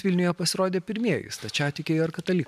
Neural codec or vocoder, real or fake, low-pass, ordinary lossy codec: vocoder, 44.1 kHz, 128 mel bands every 512 samples, BigVGAN v2; fake; 14.4 kHz; MP3, 96 kbps